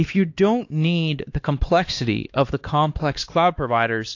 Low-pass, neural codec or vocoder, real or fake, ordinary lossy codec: 7.2 kHz; codec, 16 kHz, 2 kbps, X-Codec, HuBERT features, trained on LibriSpeech; fake; AAC, 48 kbps